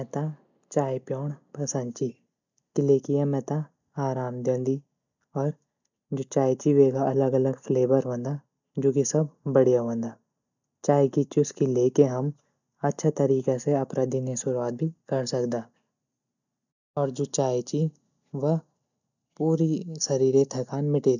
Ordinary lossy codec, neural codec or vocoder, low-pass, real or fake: none; none; 7.2 kHz; real